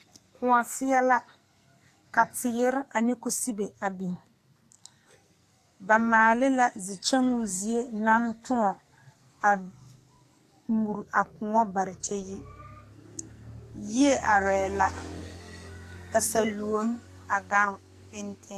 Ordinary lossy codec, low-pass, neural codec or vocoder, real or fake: AAC, 64 kbps; 14.4 kHz; codec, 44.1 kHz, 2.6 kbps, SNAC; fake